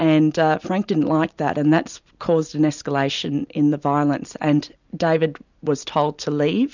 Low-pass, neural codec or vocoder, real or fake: 7.2 kHz; none; real